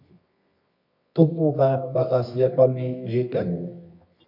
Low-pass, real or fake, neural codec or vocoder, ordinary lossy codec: 5.4 kHz; fake; codec, 24 kHz, 0.9 kbps, WavTokenizer, medium music audio release; MP3, 48 kbps